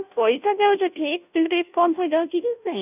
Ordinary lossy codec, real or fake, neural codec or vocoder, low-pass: none; fake; codec, 16 kHz, 0.5 kbps, FunCodec, trained on Chinese and English, 25 frames a second; 3.6 kHz